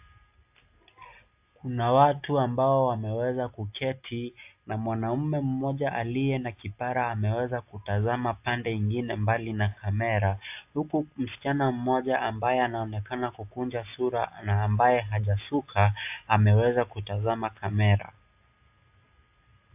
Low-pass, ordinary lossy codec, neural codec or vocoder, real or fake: 3.6 kHz; AAC, 32 kbps; none; real